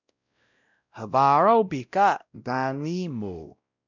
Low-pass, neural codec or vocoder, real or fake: 7.2 kHz; codec, 16 kHz, 0.5 kbps, X-Codec, WavLM features, trained on Multilingual LibriSpeech; fake